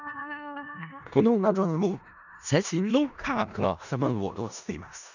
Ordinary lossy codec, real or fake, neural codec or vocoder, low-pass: none; fake; codec, 16 kHz in and 24 kHz out, 0.4 kbps, LongCat-Audio-Codec, four codebook decoder; 7.2 kHz